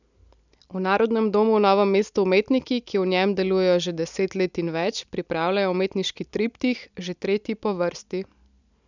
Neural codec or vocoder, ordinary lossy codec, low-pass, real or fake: none; none; 7.2 kHz; real